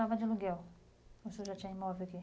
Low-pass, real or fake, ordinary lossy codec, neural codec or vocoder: none; real; none; none